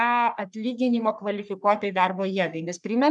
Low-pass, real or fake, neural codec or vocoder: 10.8 kHz; fake; codec, 44.1 kHz, 3.4 kbps, Pupu-Codec